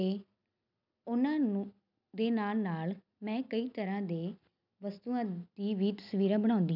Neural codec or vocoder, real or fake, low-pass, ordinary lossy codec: none; real; 5.4 kHz; none